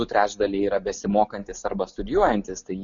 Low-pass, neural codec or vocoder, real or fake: 7.2 kHz; none; real